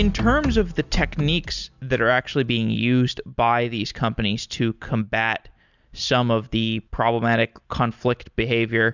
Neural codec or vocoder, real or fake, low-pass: none; real; 7.2 kHz